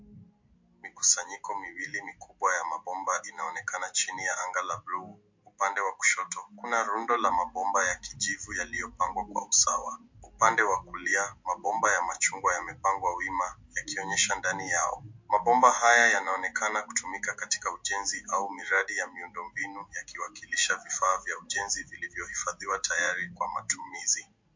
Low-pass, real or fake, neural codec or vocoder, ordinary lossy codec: 7.2 kHz; real; none; MP3, 48 kbps